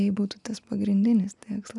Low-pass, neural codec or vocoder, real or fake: 10.8 kHz; none; real